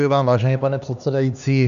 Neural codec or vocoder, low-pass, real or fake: codec, 16 kHz, 2 kbps, X-Codec, HuBERT features, trained on LibriSpeech; 7.2 kHz; fake